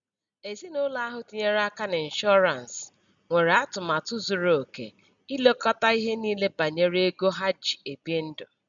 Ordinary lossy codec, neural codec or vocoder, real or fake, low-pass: none; none; real; 7.2 kHz